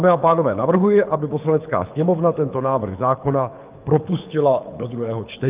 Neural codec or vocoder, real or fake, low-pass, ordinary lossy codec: codec, 24 kHz, 6 kbps, HILCodec; fake; 3.6 kHz; Opus, 24 kbps